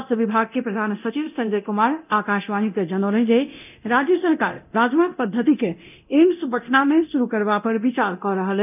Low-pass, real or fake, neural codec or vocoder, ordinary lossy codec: 3.6 kHz; fake; codec, 24 kHz, 0.9 kbps, DualCodec; none